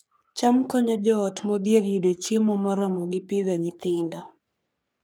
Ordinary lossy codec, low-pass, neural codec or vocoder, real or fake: none; none; codec, 44.1 kHz, 3.4 kbps, Pupu-Codec; fake